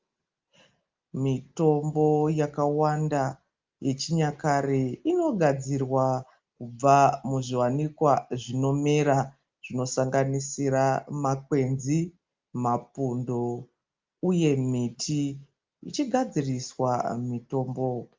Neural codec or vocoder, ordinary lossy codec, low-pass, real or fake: none; Opus, 24 kbps; 7.2 kHz; real